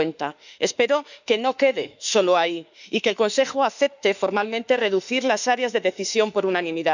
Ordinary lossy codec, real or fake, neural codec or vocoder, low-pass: none; fake; autoencoder, 48 kHz, 32 numbers a frame, DAC-VAE, trained on Japanese speech; 7.2 kHz